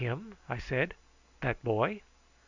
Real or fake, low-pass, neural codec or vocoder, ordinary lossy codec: real; 7.2 kHz; none; Opus, 64 kbps